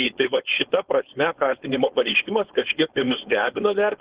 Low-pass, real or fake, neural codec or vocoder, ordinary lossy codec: 3.6 kHz; fake; codec, 16 kHz, 4.8 kbps, FACodec; Opus, 16 kbps